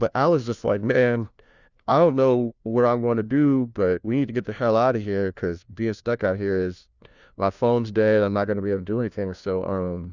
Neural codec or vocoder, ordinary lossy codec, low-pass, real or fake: codec, 16 kHz, 1 kbps, FunCodec, trained on LibriTTS, 50 frames a second; Opus, 64 kbps; 7.2 kHz; fake